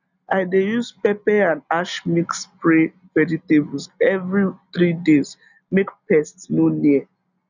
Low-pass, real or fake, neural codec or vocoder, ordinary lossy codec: 7.2 kHz; real; none; none